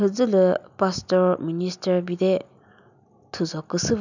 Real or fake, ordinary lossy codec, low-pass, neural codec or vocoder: real; none; 7.2 kHz; none